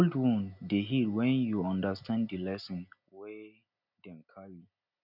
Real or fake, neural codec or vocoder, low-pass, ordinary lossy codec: real; none; 5.4 kHz; none